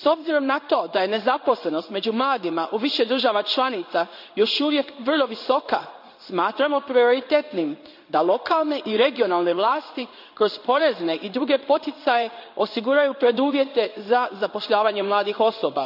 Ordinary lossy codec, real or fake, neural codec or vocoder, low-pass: none; fake; codec, 16 kHz in and 24 kHz out, 1 kbps, XY-Tokenizer; 5.4 kHz